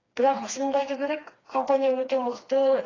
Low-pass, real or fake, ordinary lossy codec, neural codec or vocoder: 7.2 kHz; fake; AAC, 32 kbps; codec, 16 kHz, 2 kbps, FreqCodec, smaller model